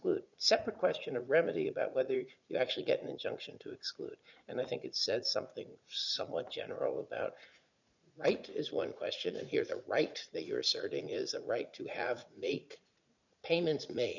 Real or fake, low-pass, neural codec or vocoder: fake; 7.2 kHz; vocoder, 44.1 kHz, 80 mel bands, Vocos